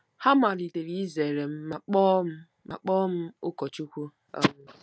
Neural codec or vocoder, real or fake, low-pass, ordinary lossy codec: none; real; none; none